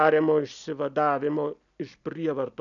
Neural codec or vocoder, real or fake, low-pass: none; real; 7.2 kHz